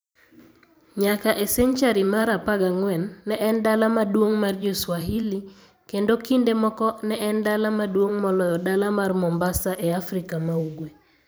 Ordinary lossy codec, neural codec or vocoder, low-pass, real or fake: none; vocoder, 44.1 kHz, 128 mel bands every 512 samples, BigVGAN v2; none; fake